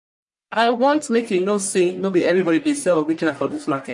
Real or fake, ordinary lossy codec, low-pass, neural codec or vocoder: fake; MP3, 48 kbps; 10.8 kHz; codec, 44.1 kHz, 1.7 kbps, Pupu-Codec